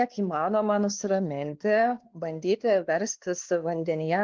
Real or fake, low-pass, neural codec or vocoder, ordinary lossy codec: fake; 7.2 kHz; codec, 16 kHz, 2 kbps, X-Codec, WavLM features, trained on Multilingual LibriSpeech; Opus, 16 kbps